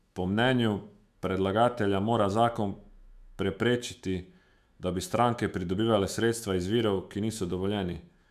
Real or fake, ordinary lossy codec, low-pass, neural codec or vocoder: fake; none; 14.4 kHz; autoencoder, 48 kHz, 128 numbers a frame, DAC-VAE, trained on Japanese speech